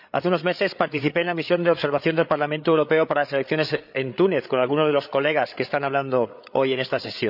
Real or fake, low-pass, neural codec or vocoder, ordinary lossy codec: fake; 5.4 kHz; codec, 16 kHz, 8 kbps, FreqCodec, larger model; none